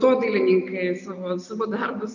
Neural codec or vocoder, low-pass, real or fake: none; 7.2 kHz; real